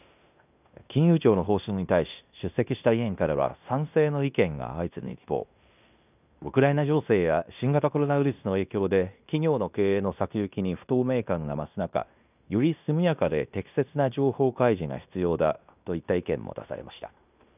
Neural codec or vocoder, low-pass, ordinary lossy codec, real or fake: codec, 16 kHz in and 24 kHz out, 0.9 kbps, LongCat-Audio-Codec, fine tuned four codebook decoder; 3.6 kHz; none; fake